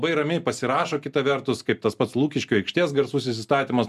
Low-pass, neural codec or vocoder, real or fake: 14.4 kHz; none; real